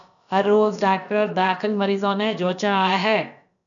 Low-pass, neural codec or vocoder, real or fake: 7.2 kHz; codec, 16 kHz, about 1 kbps, DyCAST, with the encoder's durations; fake